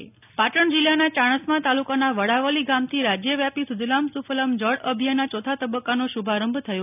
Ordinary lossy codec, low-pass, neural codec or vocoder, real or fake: none; 3.6 kHz; none; real